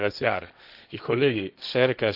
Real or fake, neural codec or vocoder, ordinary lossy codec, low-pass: fake; codec, 16 kHz, 1.1 kbps, Voila-Tokenizer; none; 5.4 kHz